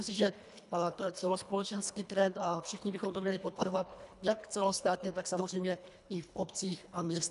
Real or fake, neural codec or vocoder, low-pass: fake; codec, 24 kHz, 1.5 kbps, HILCodec; 10.8 kHz